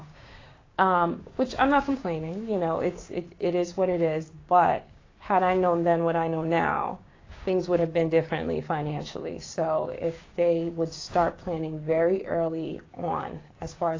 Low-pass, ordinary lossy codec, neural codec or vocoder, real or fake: 7.2 kHz; AAC, 32 kbps; codec, 16 kHz, 6 kbps, DAC; fake